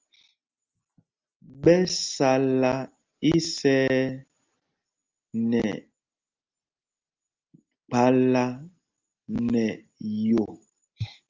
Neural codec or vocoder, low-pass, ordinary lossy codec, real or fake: none; 7.2 kHz; Opus, 24 kbps; real